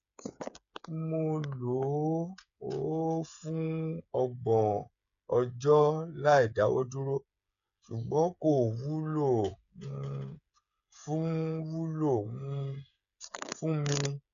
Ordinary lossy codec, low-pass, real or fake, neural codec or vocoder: none; 7.2 kHz; fake; codec, 16 kHz, 8 kbps, FreqCodec, smaller model